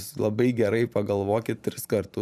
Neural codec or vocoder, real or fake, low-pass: vocoder, 44.1 kHz, 128 mel bands every 512 samples, BigVGAN v2; fake; 14.4 kHz